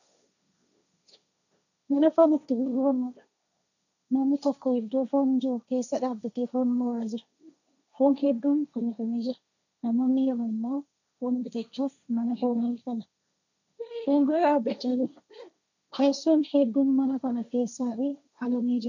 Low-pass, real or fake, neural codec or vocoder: 7.2 kHz; fake; codec, 16 kHz, 1.1 kbps, Voila-Tokenizer